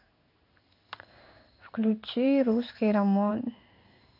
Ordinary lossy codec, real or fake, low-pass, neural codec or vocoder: none; fake; 5.4 kHz; codec, 16 kHz in and 24 kHz out, 1 kbps, XY-Tokenizer